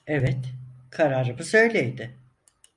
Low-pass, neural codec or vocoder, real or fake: 10.8 kHz; none; real